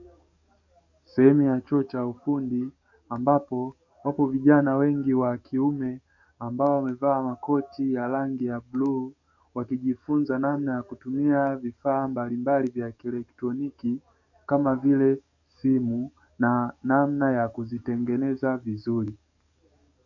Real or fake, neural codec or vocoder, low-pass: fake; codec, 44.1 kHz, 7.8 kbps, DAC; 7.2 kHz